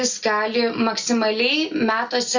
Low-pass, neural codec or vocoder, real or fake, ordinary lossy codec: 7.2 kHz; none; real; Opus, 64 kbps